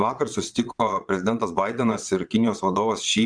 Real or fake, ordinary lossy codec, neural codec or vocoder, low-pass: fake; Opus, 64 kbps; vocoder, 22.05 kHz, 80 mel bands, Vocos; 9.9 kHz